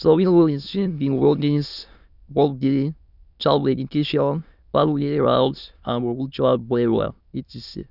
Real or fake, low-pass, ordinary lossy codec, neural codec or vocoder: fake; 5.4 kHz; none; autoencoder, 22.05 kHz, a latent of 192 numbers a frame, VITS, trained on many speakers